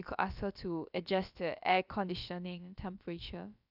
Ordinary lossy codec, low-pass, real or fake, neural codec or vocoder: none; 5.4 kHz; fake; codec, 16 kHz, about 1 kbps, DyCAST, with the encoder's durations